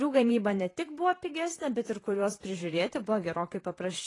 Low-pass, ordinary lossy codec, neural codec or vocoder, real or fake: 10.8 kHz; AAC, 32 kbps; vocoder, 44.1 kHz, 128 mel bands, Pupu-Vocoder; fake